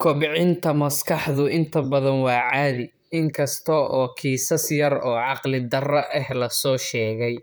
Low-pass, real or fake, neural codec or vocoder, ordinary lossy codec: none; fake; vocoder, 44.1 kHz, 128 mel bands, Pupu-Vocoder; none